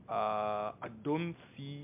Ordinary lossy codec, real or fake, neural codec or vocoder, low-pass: MP3, 32 kbps; real; none; 3.6 kHz